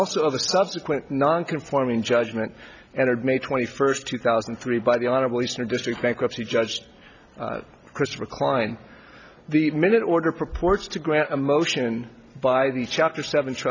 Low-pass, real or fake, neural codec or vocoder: 7.2 kHz; real; none